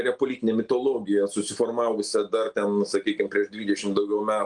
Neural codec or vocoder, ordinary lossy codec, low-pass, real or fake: none; Opus, 24 kbps; 9.9 kHz; real